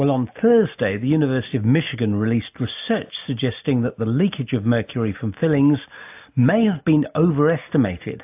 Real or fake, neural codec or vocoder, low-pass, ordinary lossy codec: real; none; 3.6 kHz; AAC, 32 kbps